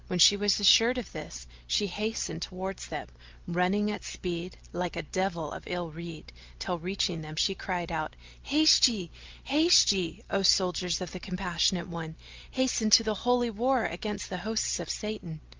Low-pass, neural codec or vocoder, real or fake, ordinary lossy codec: 7.2 kHz; none; real; Opus, 24 kbps